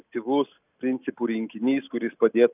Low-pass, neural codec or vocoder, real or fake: 3.6 kHz; none; real